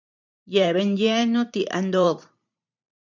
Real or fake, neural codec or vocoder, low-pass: fake; vocoder, 44.1 kHz, 128 mel bands every 512 samples, BigVGAN v2; 7.2 kHz